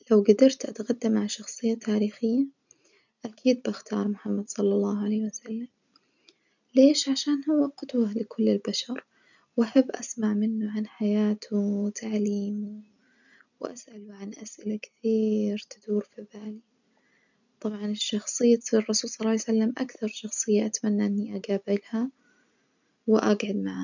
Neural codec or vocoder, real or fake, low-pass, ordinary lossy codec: none; real; 7.2 kHz; none